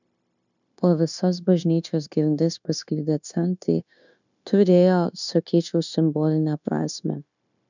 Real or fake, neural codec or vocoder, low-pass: fake; codec, 16 kHz, 0.9 kbps, LongCat-Audio-Codec; 7.2 kHz